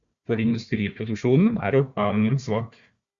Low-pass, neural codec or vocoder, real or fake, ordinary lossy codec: 7.2 kHz; codec, 16 kHz, 1 kbps, FunCodec, trained on Chinese and English, 50 frames a second; fake; Opus, 64 kbps